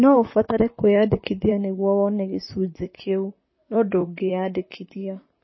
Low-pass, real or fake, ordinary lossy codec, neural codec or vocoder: 7.2 kHz; fake; MP3, 24 kbps; vocoder, 44.1 kHz, 128 mel bands, Pupu-Vocoder